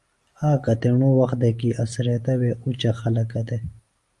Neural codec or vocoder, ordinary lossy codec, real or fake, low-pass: none; Opus, 32 kbps; real; 10.8 kHz